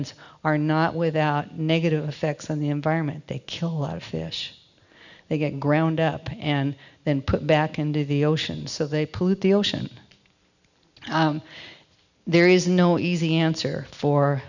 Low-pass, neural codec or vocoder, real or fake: 7.2 kHz; none; real